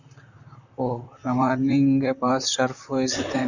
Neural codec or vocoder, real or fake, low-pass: vocoder, 44.1 kHz, 128 mel bands, Pupu-Vocoder; fake; 7.2 kHz